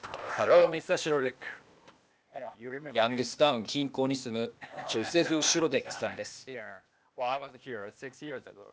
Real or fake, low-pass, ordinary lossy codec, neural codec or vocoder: fake; none; none; codec, 16 kHz, 0.8 kbps, ZipCodec